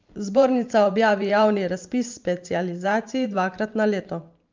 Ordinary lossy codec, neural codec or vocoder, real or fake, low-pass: Opus, 24 kbps; vocoder, 44.1 kHz, 128 mel bands every 512 samples, BigVGAN v2; fake; 7.2 kHz